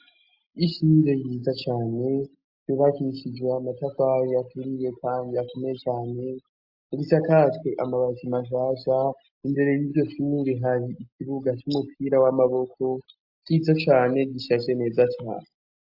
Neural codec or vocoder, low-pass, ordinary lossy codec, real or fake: none; 5.4 kHz; AAC, 48 kbps; real